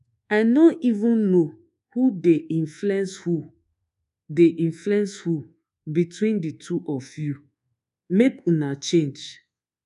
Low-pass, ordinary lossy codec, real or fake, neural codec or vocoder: 10.8 kHz; none; fake; codec, 24 kHz, 1.2 kbps, DualCodec